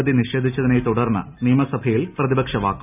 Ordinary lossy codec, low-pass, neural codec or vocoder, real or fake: none; 3.6 kHz; none; real